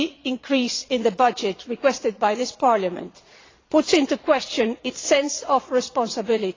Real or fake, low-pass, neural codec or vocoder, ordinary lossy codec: fake; 7.2 kHz; vocoder, 22.05 kHz, 80 mel bands, Vocos; AAC, 32 kbps